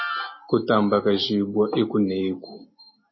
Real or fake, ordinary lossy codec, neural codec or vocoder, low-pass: real; MP3, 24 kbps; none; 7.2 kHz